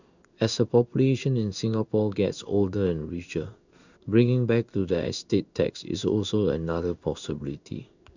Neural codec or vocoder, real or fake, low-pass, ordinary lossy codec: codec, 16 kHz in and 24 kHz out, 1 kbps, XY-Tokenizer; fake; 7.2 kHz; none